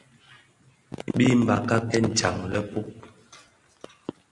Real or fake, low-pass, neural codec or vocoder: real; 10.8 kHz; none